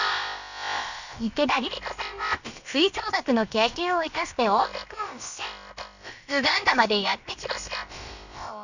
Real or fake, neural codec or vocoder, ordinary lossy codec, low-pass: fake; codec, 16 kHz, about 1 kbps, DyCAST, with the encoder's durations; none; 7.2 kHz